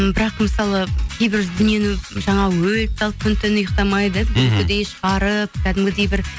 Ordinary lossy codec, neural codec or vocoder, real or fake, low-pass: none; none; real; none